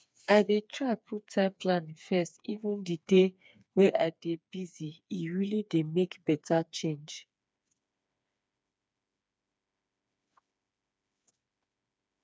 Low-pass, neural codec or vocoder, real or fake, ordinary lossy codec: none; codec, 16 kHz, 4 kbps, FreqCodec, smaller model; fake; none